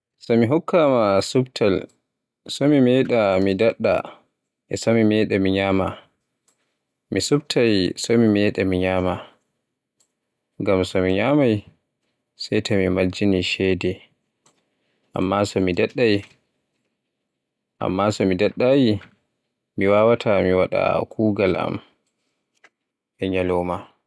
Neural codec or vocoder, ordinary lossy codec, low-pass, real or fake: none; none; none; real